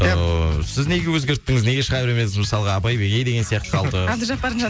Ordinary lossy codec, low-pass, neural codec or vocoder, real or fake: none; none; none; real